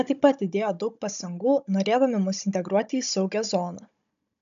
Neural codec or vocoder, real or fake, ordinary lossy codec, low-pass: codec, 16 kHz, 16 kbps, FunCodec, trained on Chinese and English, 50 frames a second; fake; AAC, 96 kbps; 7.2 kHz